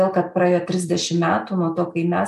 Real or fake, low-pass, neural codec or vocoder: real; 14.4 kHz; none